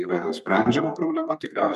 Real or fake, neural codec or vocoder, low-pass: fake; codec, 32 kHz, 1.9 kbps, SNAC; 14.4 kHz